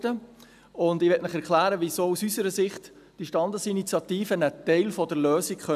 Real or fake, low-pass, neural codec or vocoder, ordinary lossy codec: real; 14.4 kHz; none; none